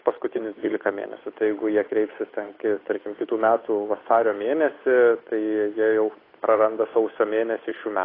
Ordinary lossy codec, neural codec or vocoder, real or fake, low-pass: AAC, 24 kbps; codec, 16 kHz, 8 kbps, FunCodec, trained on Chinese and English, 25 frames a second; fake; 5.4 kHz